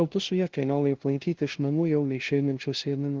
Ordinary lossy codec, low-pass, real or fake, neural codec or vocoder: Opus, 16 kbps; 7.2 kHz; fake; codec, 16 kHz, 0.5 kbps, FunCodec, trained on LibriTTS, 25 frames a second